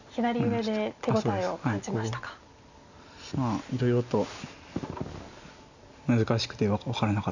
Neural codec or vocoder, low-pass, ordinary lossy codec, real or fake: none; 7.2 kHz; none; real